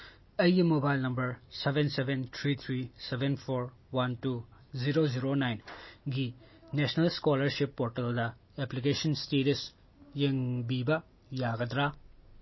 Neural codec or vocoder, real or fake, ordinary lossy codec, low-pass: none; real; MP3, 24 kbps; 7.2 kHz